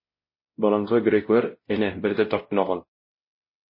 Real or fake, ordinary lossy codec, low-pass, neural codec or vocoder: fake; MP3, 24 kbps; 5.4 kHz; codec, 16 kHz, 0.5 kbps, X-Codec, WavLM features, trained on Multilingual LibriSpeech